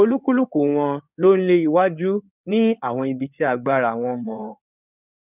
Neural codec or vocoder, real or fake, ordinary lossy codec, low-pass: codec, 16 kHz in and 24 kHz out, 2.2 kbps, FireRedTTS-2 codec; fake; none; 3.6 kHz